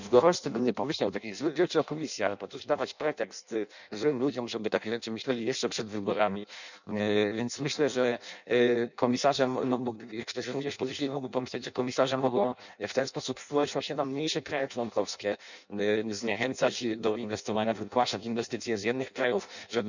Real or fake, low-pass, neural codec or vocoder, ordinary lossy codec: fake; 7.2 kHz; codec, 16 kHz in and 24 kHz out, 0.6 kbps, FireRedTTS-2 codec; none